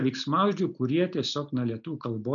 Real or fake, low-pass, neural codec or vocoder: real; 7.2 kHz; none